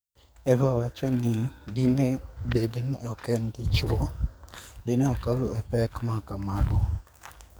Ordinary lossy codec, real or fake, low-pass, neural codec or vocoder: none; fake; none; codec, 44.1 kHz, 2.6 kbps, SNAC